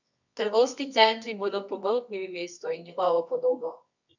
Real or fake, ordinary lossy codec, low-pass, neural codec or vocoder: fake; none; 7.2 kHz; codec, 24 kHz, 0.9 kbps, WavTokenizer, medium music audio release